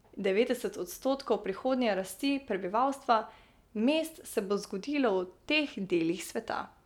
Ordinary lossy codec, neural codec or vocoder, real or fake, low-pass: none; none; real; 19.8 kHz